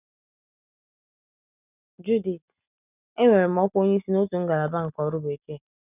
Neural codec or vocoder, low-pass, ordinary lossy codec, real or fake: none; 3.6 kHz; none; real